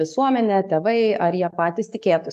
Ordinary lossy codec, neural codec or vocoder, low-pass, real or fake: AAC, 96 kbps; codec, 44.1 kHz, 7.8 kbps, DAC; 14.4 kHz; fake